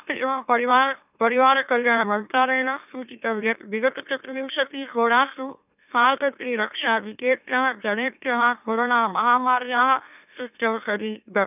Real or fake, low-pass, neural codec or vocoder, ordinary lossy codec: fake; 3.6 kHz; autoencoder, 44.1 kHz, a latent of 192 numbers a frame, MeloTTS; none